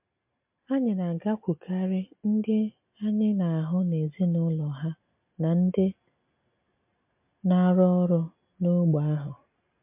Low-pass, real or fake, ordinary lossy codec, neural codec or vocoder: 3.6 kHz; real; MP3, 32 kbps; none